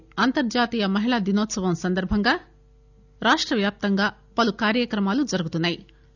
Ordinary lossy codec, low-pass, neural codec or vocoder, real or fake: none; 7.2 kHz; none; real